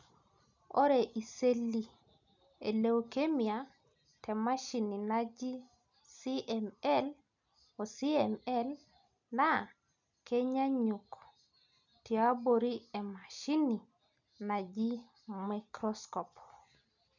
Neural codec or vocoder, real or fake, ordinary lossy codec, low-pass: none; real; none; 7.2 kHz